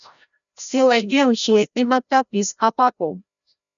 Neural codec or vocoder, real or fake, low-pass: codec, 16 kHz, 0.5 kbps, FreqCodec, larger model; fake; 7.2 kHz